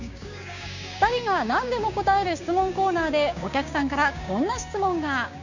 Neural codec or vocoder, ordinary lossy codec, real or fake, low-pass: codec, 16 kHz, 6 kbps, DAC; none; fake; 7.2 kHz